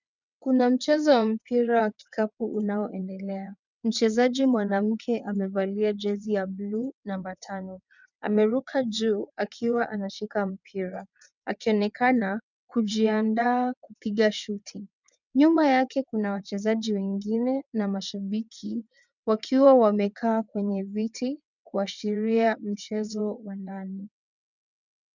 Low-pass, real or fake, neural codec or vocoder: 7.2 kHz; fake; vocoder, 22.05 kHz, 80 mel bands, WaveNeXt